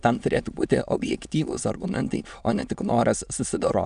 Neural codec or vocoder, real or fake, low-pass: autoencoder, 22.05 kHz, a latent of 192 numbers a frame, VITS, trained on many speakers; fake; 9.9 kHz